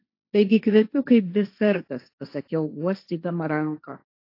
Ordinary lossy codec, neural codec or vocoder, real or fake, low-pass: AAC, 32 kbps; codec, 16 kHz, 1.1 kbps, Voila-Tokenizer; fake; 5.4 kHz